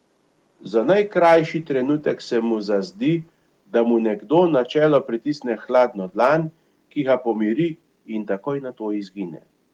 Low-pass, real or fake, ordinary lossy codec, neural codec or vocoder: 19.8 kHz; real; Opus, 24 kbps; none